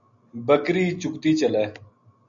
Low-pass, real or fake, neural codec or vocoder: 7.2 kHz; real; none